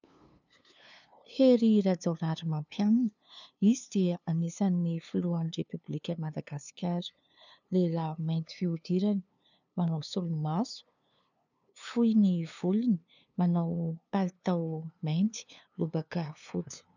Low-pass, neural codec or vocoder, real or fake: 7.2 kHz; codec, 16 kHz, 2 kbps, FunCodec, trained on LibriTTS, 25 frames a second; fake